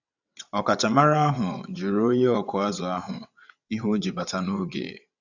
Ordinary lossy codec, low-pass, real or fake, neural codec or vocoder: none; 7.2 kHz; fake; vocoder, 22.05 kHz, 80 mel bands, WaveNeXt